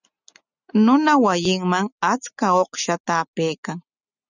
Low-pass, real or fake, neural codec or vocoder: 7.2 kHz; real; none